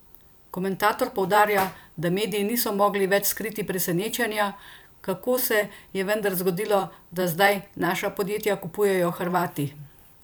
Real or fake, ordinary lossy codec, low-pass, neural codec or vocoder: fake; none; none; vocoder, 44.1 kHz, 128 mel bands every 512 samples, BigVGAN v2